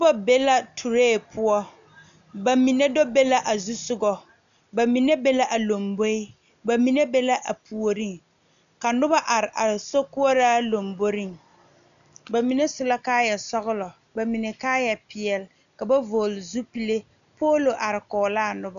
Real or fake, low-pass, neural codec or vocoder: real; 7.2 kHz; none